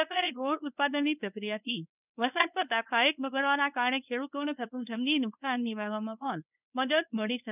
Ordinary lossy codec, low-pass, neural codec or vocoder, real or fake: none; 3.6 kHz; codec, 24 kHz, 0.9 kbps, WavTokenizer, medium speech release version 1; fake